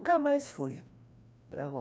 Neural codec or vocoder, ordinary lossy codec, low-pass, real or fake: codec, 16 kHz, 1 kbps, FreqCodec, larger model; none; none; fake